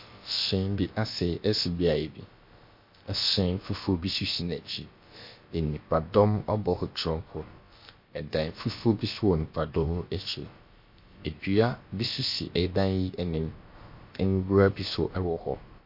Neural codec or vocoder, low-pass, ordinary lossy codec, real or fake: codec, 16 kHz, about 1 kbps, DyCAST, with the encoder's durations; 5.4 kHz; MP3, 32 kbps; fake